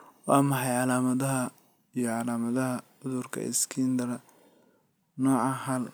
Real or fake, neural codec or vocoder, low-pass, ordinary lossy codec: real; none; none; none